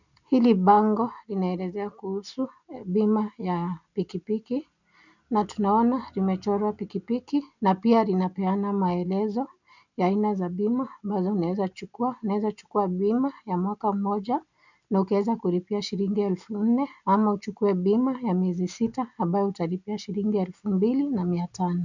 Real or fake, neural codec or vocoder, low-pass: real; none; 7.2 kHz